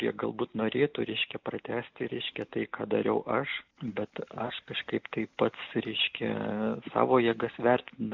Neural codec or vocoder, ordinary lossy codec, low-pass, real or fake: none; MP3, 64 kbps; 7.2 kHz; real